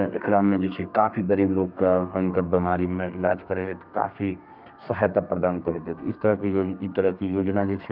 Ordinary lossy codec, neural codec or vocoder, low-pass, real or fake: none; codec, 32 kHz, 1.9 kbps, SNAC; 5.4 kHz; fake